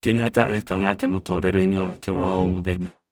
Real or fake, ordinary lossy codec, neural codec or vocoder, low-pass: fake; none; codec, 44.1 kHz, 0.9 kbps, DAC; none